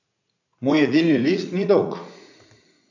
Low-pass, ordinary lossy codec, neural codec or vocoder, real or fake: 7.2 kHz; none; vocoder, 44.1 kHz, 80 mel bands, Vocos; fake